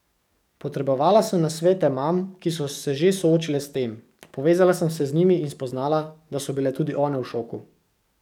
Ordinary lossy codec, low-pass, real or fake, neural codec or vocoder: none; 19.8 kHz; fake; codec, 44.1 kHz, 7.8 kbps, DAC